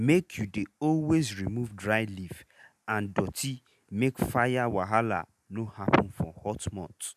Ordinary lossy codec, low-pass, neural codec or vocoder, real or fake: none; 14.4 kHz; none; real